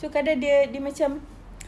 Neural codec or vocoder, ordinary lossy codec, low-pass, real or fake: none; none; none; real